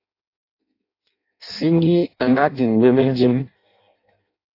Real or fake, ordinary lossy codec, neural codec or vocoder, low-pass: fake; AAC, 32 kbps; codec, 16 kHz in and 24 kHz out, 0.6 kbps, FireRedTTS-2 codec; 5.4 kHz